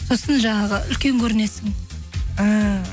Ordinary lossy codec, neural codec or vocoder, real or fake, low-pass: none; none; real; none